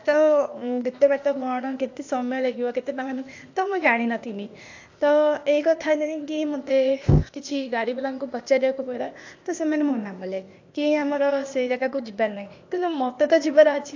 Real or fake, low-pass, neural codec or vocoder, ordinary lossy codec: fake; 7.2 kHz; codec, 16 kHz, 0.8 kbps, ZipCodec; AAC, 48 kbps